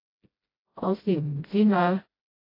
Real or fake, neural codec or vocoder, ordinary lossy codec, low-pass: fake; codec, 16 kHz, 0.5 kbps, FreqCodec, smaller model; AAC, 32 kbps; 5.4 kHz